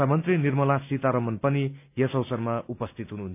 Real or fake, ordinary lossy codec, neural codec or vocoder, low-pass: real; none; none; 3.6 kHz